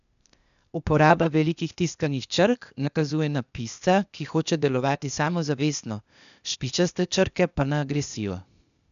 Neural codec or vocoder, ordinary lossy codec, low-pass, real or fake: codec, 16 kHz, 0.8 kbps, ZipCodec; none; 7.2 kHz; fake